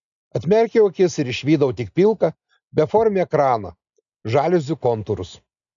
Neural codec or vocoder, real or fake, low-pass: none; real; 7.2 kHz